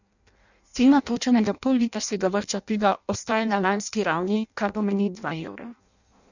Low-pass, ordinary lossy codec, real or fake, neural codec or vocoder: 7.2 kHz; none; fake; codec, 16 kHz in and 24 kHz out, 0.6 kbps, FireRedTTS-2 codec